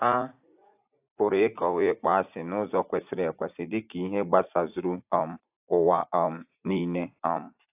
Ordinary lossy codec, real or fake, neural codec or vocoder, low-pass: AAC, 32 kbps; fake; vocoder, 44.1 kHz, 80 mel bands, Vocos; 3.6 kHz